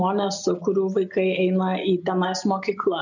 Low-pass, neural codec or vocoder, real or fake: 7.2 kHz; none; real